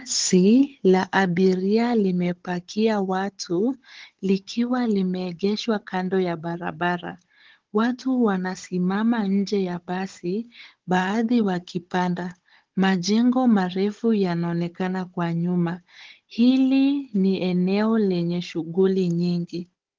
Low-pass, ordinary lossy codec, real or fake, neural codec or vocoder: 7.2 kHz; Opus, 16 kbps; fake; codec, 16 kHz, 16 kbps, FunCodec, trained on Chinese and English, 50 frames a second